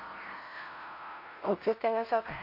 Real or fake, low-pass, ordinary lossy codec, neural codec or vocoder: fake; 5.4 kHz; MP3, 32 kbps; codec, 16 kHz, 0.5 kbps, FunCodec, trained on LibriTTS, 25 frames a second